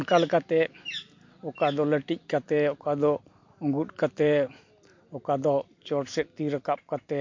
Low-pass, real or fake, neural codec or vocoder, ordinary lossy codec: 7.2 kHz; real; none; MP3, 48 kbps